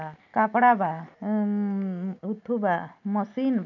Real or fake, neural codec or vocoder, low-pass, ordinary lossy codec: real; none; 7.2 kHz; none